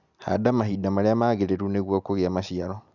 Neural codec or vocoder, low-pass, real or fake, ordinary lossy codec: none; 7.2 kHz; real; none